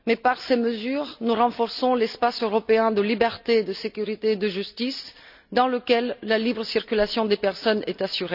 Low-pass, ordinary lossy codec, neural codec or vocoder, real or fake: 5.4 kHz; none; none; real